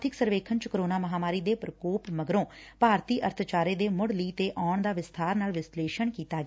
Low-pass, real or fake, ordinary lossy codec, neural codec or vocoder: none; real; none; none